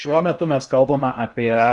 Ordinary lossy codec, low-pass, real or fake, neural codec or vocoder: Opus, 64 kbps; 10.8 kHz; fake; codec, 16 kHz in and 24 kHz out, 0.8 kbps, FocalCodec, streaming, 65536 codes